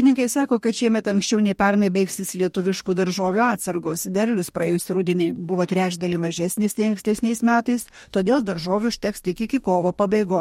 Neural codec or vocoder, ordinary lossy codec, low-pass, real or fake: codec, 44.1 kHz, 2.6 kbps, DAC; MP3, 64 kbps; 19.8 kHz; fake